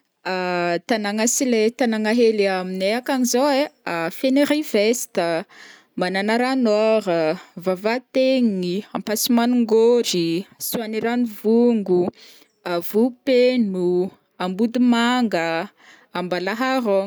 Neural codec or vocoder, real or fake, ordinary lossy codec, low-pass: none; real; none; none